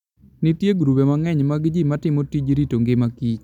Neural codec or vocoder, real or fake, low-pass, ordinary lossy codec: none; real; 19.8 kHz; none